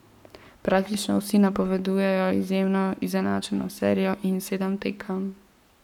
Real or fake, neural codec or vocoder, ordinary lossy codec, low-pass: fake; codec, 44.1 kHz, 7.8 kbps, Pupu-Codec; none; 19.8 kHz